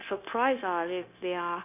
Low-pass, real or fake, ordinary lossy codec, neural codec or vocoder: 3.6 kHz; fake; none; codec, 16 kHz, 0.9 kbps, LongCat-Audio-Codec